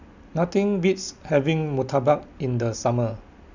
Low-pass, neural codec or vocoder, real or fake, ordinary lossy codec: 7.2 kHz; none; real; none